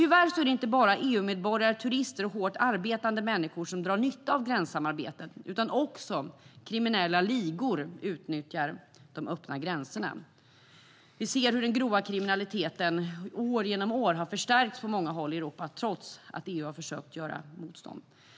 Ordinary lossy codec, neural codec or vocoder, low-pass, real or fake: none; none; none; real